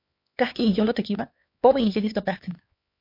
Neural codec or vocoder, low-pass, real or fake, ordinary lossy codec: codec, 16 kHz, 4 kbps, X-Codec, HuBERT features, trained on LibriSpeech; 5.4 kHz; fake; MP3, 32 kbps